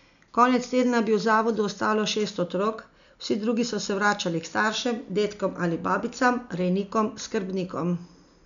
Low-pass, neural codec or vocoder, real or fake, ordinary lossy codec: 7.2 kHz; none; real; none